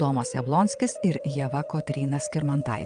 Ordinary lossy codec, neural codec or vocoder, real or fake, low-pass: Opus, 32 kbps; vocoder, 22.05 kHz, 80 mel bands, WaveNeXt; fake; 9.9 kHz